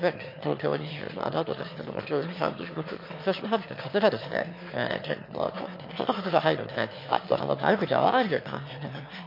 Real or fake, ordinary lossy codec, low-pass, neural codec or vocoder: fake; MP3, 32 kbps; 5.4 kHz; autoencoder, 22.05 kHz, a latent of 192 numbers a frame, VITS, trained on one speaker